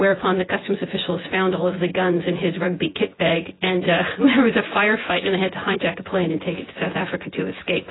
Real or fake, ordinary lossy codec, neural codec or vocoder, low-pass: fake; AAC, 16 kbps; vocoder, 24 kHz, 100 mel bands, Vocos; 7.2 kHz